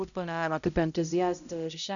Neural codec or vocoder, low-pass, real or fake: codec, 16 kHz, 0.5 kbps, X-Codec, HuBERT features, trained on balanced general audio; 7.2 kHz; fake